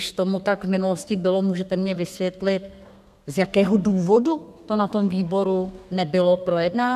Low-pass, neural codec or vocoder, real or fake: 14.4 kHz; codec, 32 kHz, 1.9 kbps, SNAC; fake